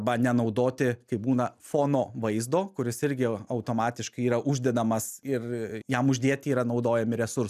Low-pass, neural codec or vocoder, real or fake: 14.4 kHz; none; real